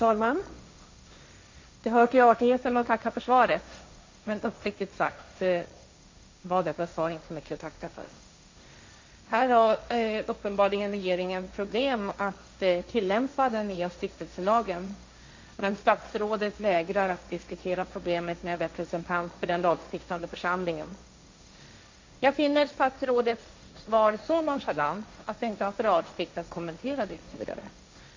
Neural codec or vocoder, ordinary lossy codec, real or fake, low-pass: codec, 16 kHz, 1.1 kbps, Voila-Tokenizer; MP3, 48 kbps; fake; 7.2 kHz